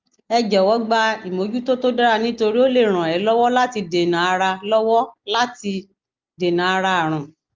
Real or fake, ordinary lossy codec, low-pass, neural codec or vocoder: real; Opus, 24 kbps; 7.2 kHz; none